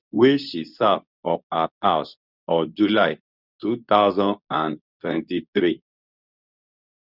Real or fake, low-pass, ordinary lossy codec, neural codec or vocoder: fake; 5.4 kHz; none; codec, 24 kHz, 0.9 kbps, WavTokenizer, medium speech release version 2